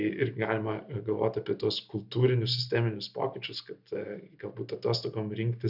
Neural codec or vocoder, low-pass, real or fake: none; 5.4 kHz; real